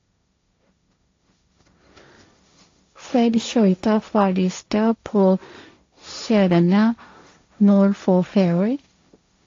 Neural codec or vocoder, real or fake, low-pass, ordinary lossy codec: codec, 16 kHz, 1.1 kbps, Voila-Tokenizer; fake; 7.2 kHz; AAC, 48 kbps